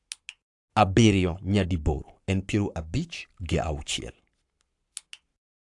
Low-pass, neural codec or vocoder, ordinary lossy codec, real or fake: 10.8 kHz; codec, 44.1 kHz, 7.8 kbps, Pupu-Codec; AAC, 64 kbps; fake